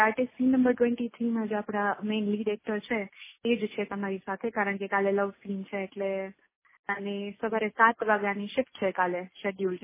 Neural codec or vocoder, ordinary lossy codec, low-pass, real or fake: none; MP3, 16 kbps; 3.6 kHz; real